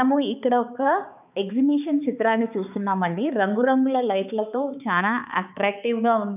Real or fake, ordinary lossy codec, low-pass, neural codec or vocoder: fake; none; 3.6 kHz; codec, 16 kHz, 4 kbps, X-Codec, HuBERT features, trained on balanced general audio